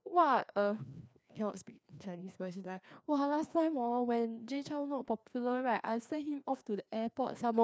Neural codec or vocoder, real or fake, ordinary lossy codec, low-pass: codec, 16 kHz, 2 kbps, FreqCodec, larger model; fake; none; none